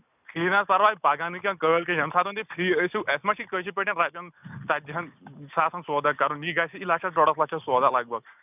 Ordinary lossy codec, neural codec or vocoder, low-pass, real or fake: none; none; 3.6 kHz; real